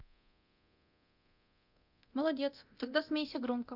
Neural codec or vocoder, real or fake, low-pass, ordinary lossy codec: codec, 24 kHz, 0.9 kbps, DualCodec; fake; 5.4 kHz; none